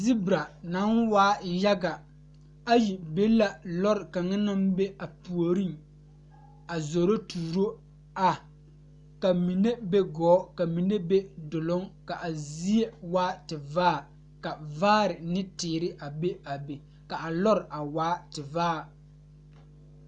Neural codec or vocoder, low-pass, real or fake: none; 10.8 kHz; real